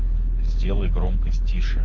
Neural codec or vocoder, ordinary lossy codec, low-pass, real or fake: codec, 24 kHz, 6 kbps, HILCodec; MP3, 32 kbps; 7.2 kHz; fake